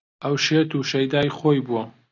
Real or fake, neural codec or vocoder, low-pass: real; none; 7.2 kHz